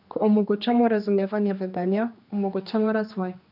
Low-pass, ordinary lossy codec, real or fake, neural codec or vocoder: 5.4 kHz; none; fake; codec, 16 kHz, 2 kbps, X-Codec, HuBERT features, trained on general audio